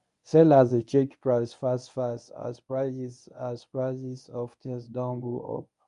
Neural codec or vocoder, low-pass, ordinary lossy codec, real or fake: codec, 24 kHz, 0.9 kbps, WavTokenizer, medium speech release version 1; 10.8 kHz; none; fake